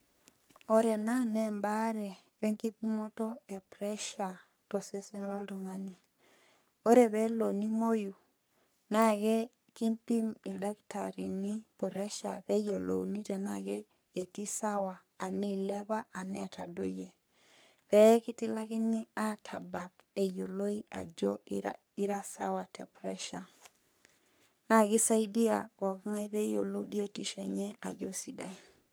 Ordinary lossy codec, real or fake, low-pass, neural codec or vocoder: none; fake; none; codec, 44.1 kHz, 3.4 kbps, Pupu-Codec